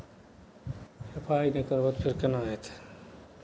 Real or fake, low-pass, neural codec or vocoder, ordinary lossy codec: real; none; none; none